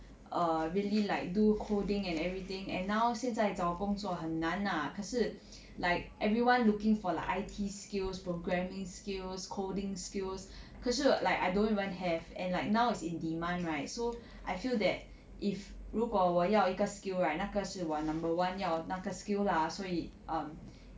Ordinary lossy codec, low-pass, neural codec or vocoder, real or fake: none; none; none; real